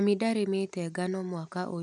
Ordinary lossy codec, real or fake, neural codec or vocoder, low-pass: none; real; none; 10.8 kHz